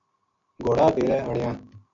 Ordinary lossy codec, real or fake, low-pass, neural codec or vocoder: AAC, 64 kbps; real; 7.2 kHz; none